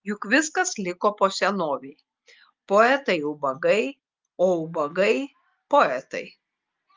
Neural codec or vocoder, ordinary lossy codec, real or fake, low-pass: none; Opus, 32 kbps; real; 7.2 kHz